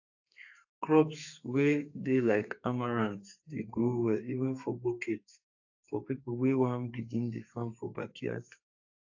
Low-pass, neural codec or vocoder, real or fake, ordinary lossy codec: 7.2 kHz; codec, 32 kHz, 1.9 kbps, SNAC; fake; AAC, 48 kbps